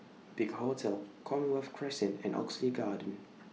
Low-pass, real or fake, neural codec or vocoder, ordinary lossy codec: none; real; none; none